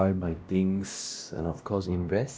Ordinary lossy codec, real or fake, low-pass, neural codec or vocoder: none; fake; none; codec, 16 kHz, 1 kbps, X-Codec, WavLM features, trained on Multilingual LibriSpeech